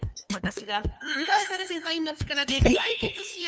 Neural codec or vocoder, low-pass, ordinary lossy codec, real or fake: codec, 16 kHz, 2 kbps, FunCodec, trained on LibriTTS, 25 frames a second; none; none; fake